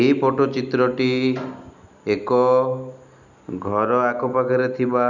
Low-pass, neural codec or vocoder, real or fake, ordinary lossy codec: 7.2 kHz; none; real; none